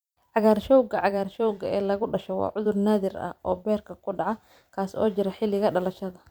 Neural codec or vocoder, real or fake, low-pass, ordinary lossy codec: vocoder, 44.1 kHz, 128 mel bands every 256 samples, BigVGAN v2; fake; none; none